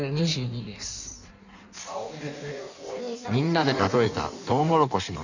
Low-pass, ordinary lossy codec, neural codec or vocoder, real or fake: 7.2 kHz; none; codec, 16 kHz in and 24 kHz out, 1.1 kbps, FireRedTTS-2 codec; fake